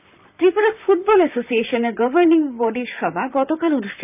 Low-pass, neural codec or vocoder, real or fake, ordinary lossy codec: 3.6 kHz; vocoder, 44.1 kHz, 128 mel bands, Pupu-Vocoder; fake; none